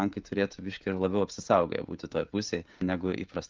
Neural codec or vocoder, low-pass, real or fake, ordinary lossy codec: codec, 16 kHz, 16 kbps, FreqCodec, smaller model; 7.2 kHz; fake; Opus, 32 kbps